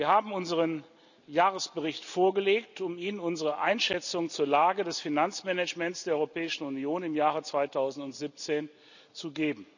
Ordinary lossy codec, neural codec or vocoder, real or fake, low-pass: none; none; real; 7.2 kHz